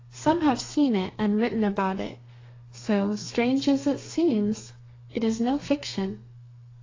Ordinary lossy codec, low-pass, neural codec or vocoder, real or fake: AAC, 32 kbps; 7.2 kHz; codec, 32 kHz, 1.9 kbps, SNAC; fake